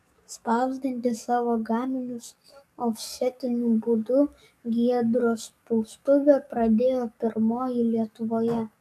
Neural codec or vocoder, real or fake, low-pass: codec, 44.1 kHz, 7.8 kbps, DAC; fake; 14.4 kHz